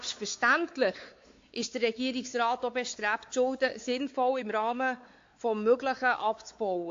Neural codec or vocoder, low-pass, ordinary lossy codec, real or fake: codec, 16 kHz, 4 kbps, X-Codec, WavLM features, trained on Multilingual LibriSpeech; 7.2 kHz; AAC, 48 kbps; fake